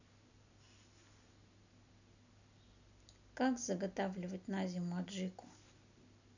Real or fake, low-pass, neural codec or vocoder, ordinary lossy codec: real; 7.2 kHz; none; none